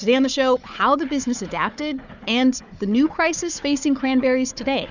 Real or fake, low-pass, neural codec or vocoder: fake; 7.2 kHz; codec, 16 kHz, 4 kbps, FunCodec, trained on Chinese and English, 50 frames a second